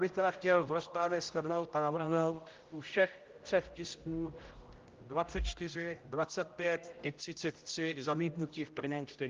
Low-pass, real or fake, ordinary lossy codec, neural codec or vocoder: 7.2 kHz; fake; Opus, 32 kbps; codec, 16 kHz, 0.5 kbps, X-Codec, HuBERT features, trained on general audio